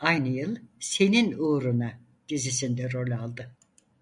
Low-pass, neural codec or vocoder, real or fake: 10.8 kHz; none; real